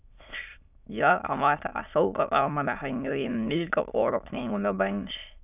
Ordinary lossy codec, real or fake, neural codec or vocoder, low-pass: none; fake; autoencoder, 22.05 kHz, a latent of 192 numbers a frame, VITS, trained on many speakers; 3.6 kHz